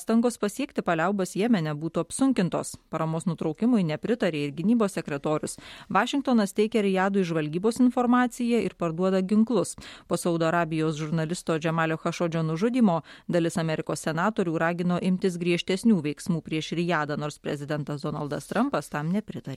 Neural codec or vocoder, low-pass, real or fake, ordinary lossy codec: none; 19.8 kHz; real; MP3, 64 kbps